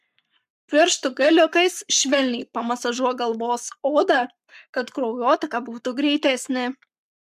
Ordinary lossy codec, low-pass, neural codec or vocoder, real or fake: MP3, 96 kbps; 14.4 kHz; codec, 44.1 kHz, 7.8 kbps, Pupu-Codec; fake